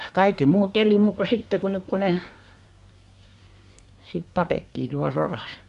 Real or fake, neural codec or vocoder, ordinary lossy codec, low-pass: fake; codec, 24 kHz, 1 kbps, SNAC; none; 10.8 kHz